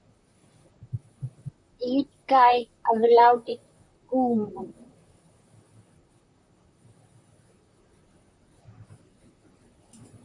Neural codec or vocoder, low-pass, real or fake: vocoder, 44.1 kHz, 128 mel bands, Pupu-Vocoder; 10.8 kHz; fake